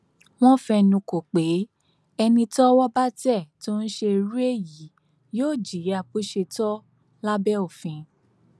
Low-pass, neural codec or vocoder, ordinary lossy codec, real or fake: none; none; none; real